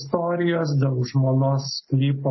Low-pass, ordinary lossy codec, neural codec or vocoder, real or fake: 7.2 kHz; MP3, 24 kbps; none; real